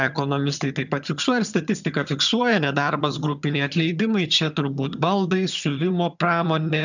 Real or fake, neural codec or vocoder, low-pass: fake; vocoder, 22.05 kHz, 80 mel bands, HiFi-GAN; 7.2 kHz